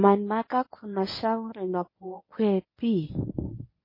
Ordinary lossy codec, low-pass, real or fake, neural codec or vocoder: MP3, 24 kbps; 5.4 kHz; fake; codec, 24 kHz, 0.9 kbps, WavTokenizer, medium speech release version 1